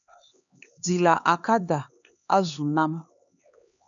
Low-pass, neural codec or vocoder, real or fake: 7.2 kHz; codec, 16 kHz, 2 kbps, X-Codec, HuBERT features, trained on LibriSpeech; fake